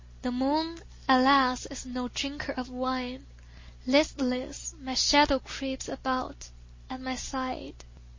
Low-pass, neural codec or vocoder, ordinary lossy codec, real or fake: 7.2 kHz; none; MP3, 32 kbps; real